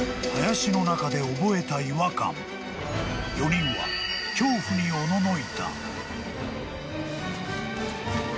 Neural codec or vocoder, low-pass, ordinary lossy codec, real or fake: none; none; none; real